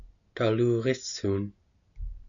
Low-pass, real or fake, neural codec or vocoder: 7.2 kHz; real; none